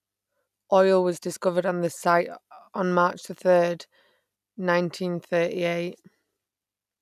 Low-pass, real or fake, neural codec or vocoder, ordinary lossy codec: 14.4 kHz; real; none; none